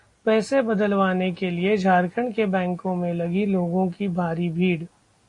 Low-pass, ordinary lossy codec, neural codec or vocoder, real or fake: 10.8 kHz; AAC, 48 kbps; none; real